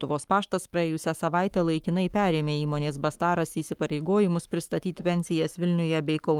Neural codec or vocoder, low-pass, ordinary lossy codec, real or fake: codec, 44.1 kHz, 7.8 kbps, Pupu-Codec; 19.8 kHz; Opus, 32 kbps; fake